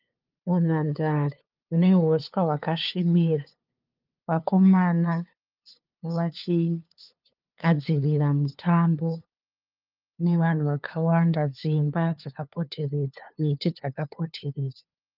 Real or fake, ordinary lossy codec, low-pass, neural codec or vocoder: fake; Opus, 24 kbps; 5.4 kHz; codec, 16 kHz, 2 kbps, FunCodec, trained on LibriTTS, 25 frames a second